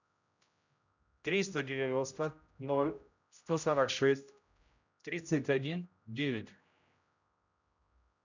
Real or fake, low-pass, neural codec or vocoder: fake; 7.2 kHz; codec, 16 kHz, 0.5 kbps, X-Codec, HuBERT features, trained on general audio